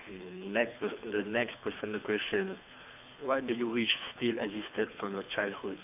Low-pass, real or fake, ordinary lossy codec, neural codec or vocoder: 3.6 kHz; fake; AAC, 32 kbps; codec, 24 kHz, 3 kbps, HILCodec